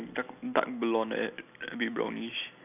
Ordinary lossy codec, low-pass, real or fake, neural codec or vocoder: none; 3.6 kHz; real; none